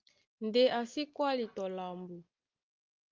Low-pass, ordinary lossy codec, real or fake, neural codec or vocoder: 7.2 kHz; Opus, 24 kbps; real; none